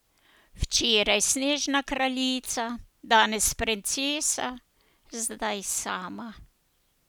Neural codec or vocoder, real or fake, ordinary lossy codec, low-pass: none; real; none; none